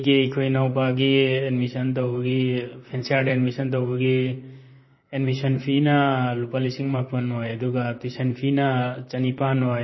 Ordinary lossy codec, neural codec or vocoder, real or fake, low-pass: MP3, 24 kbps; vocoder, 44.1 kHz, 128 mel bands, Pupu-Vocoder; fake; 7.2 kHz